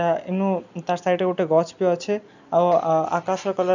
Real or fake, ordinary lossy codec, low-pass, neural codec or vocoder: real; none; 7.2 kHz; none